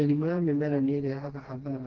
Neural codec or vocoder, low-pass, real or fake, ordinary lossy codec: codec, 16 kHz, 2 kbps, FreqCodec, smaller model; 7.2 kHz; fake; Opus, 16 kbps